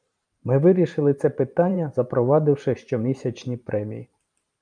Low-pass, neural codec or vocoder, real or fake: 9.9 kHz; vocoder, 44.1 kHz, 128 mel bands every 512 samples, BigVGAN v2; fake